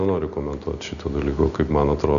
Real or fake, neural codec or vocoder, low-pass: real; none; 7.2 kHz